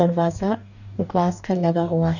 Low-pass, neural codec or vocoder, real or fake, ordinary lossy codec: 7.2 kHz; codec, 44.1 kHz, 2.6 kbps, DAC; fake; none